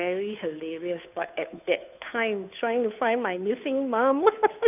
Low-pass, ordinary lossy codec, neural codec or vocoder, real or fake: 3.6 kHz; none; codec, 16 kHz, 2 kbps, FunCodec, trained on Chinese and English, 25 frames a second; fake